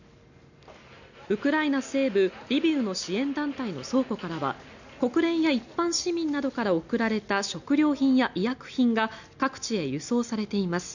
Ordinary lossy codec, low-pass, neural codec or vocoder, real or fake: none; 7.2 kHz; none; real